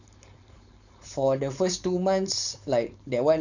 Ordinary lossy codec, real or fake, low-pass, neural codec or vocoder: none; fake; 7.2 kHz; codec, 16 kHz, 4.8 kbps, FACodec